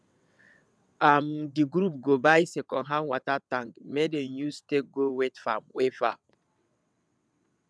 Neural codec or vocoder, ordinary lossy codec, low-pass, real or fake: vocoder, 22.05 kHz, 80 mel bands, WaveNeXt; none; none; fake